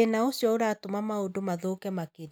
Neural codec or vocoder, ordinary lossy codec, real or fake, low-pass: none; none; real; none